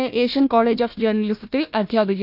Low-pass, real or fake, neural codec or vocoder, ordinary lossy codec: 5.4 kHz; fake; codec, 16 kHz, 1 kbps, FunCodec, trained on Chinese and English, 50 frames a second; none